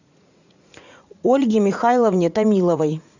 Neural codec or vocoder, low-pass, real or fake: vocoder, 44.1 kHz, 80 mel bands, Vocos; 7.2 kHz; fake